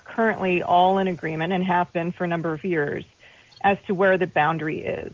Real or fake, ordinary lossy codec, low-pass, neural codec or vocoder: real; Opus, 32 kbps; 7.2 kHz; none